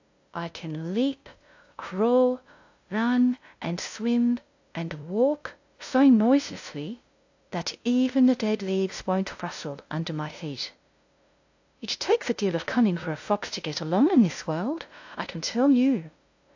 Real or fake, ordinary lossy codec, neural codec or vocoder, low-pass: fake; AAC, 48 kbps; codec, 16 kHz, 0.5 kbps, FunCodec, trained on LibriTTS, 25 frames a second; 7.2 kHz